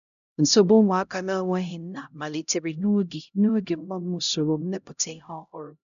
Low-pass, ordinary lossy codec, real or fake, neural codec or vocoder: 7.2 kHz; none; fake; codec, 16 kHz, 0.5 kbps, X-Codec, HuBERT features, trained on LibriSpeech